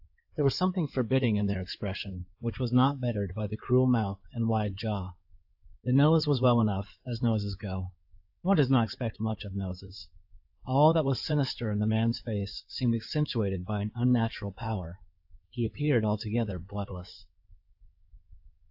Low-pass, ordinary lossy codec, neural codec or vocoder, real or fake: 5.4 kHz; AAC, 48 kbps; codec, 16 kHz in and 24 kHz out, 2.2 kbps, FireRedTTS-2 codec; fake